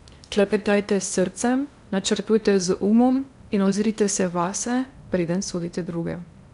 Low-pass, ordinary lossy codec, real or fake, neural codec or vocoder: 10.8 kHz; none; fake; codec, 16 kHz in and 24 kHz out, 0.8 kbps, FocalCodec, streaming, 65536 codes